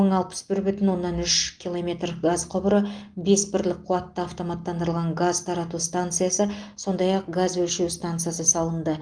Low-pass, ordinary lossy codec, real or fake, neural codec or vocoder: 9.9 kHz; Opus, 24 kbps; real; none